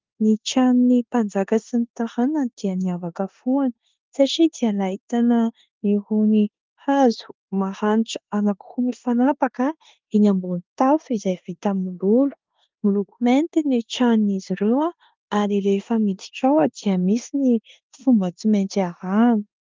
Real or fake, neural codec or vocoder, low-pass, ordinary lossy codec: fake; codec, 16 kHz in and 24 kHz out, 0.9 kbps, LongCat-Audio-Codec, four codebook decoder; 7.2 kHz; Opus, 32 kbps